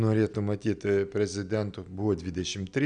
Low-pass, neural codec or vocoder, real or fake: 9.9 kHz; none; real